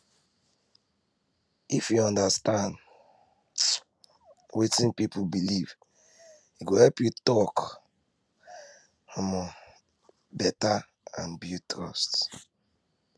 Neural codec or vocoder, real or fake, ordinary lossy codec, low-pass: none; real; none; none